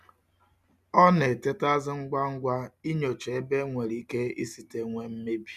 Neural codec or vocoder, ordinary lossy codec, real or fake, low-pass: none; none; real; 14.4 kHz